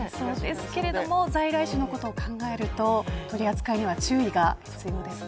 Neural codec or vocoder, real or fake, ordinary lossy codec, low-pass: none; real; none; none